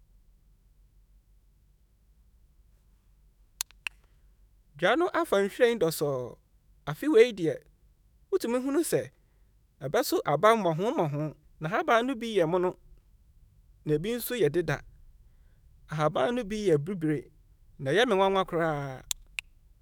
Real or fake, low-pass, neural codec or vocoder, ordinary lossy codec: fake; none; autoencoder, 48 kHz, 128 numbers a frame, DAC-VAE, trained on Japanese speech; none